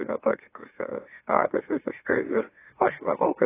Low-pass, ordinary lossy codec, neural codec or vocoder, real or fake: 3.6 kHz; AAC, 16 kbps; autoencoder, 44.1 kHz, a latent of 192 numbers a frame, MeloTTS; fake